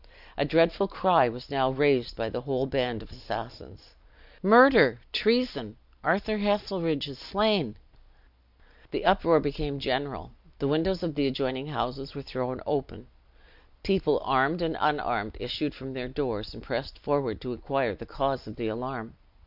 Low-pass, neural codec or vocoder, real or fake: 5.4 kHz; none; real